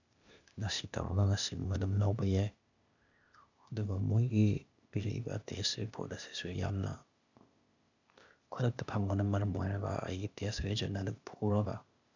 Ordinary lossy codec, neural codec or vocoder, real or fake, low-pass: none; codec, 16 kHz, 0.8 kbps, ZipCodec; fake; 7.2 kHz